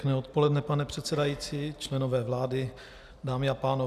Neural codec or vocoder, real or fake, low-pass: none; real; 14.4 kHz